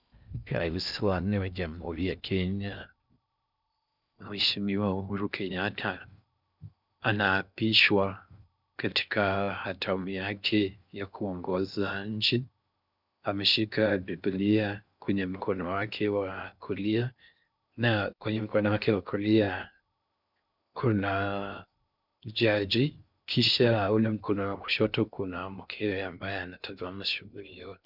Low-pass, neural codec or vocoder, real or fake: 5.4 kHz; codec, 16 kHz in and 24 kHz out, 0.6 kbps, FocalCodec, streaming, 4096 codes; fake